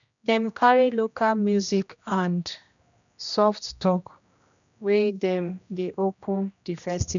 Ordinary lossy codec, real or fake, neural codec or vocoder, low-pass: MP3, 96 kbps; fake; codec, 16 kHz, 1 kbps, X-Codec, HuBERT features, trained on general audio; 7.2 kHz